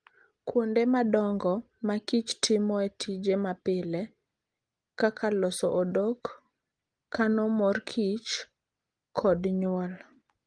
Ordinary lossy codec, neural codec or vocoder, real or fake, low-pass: Opus, 24 kbps; none; real; 9.9 kHz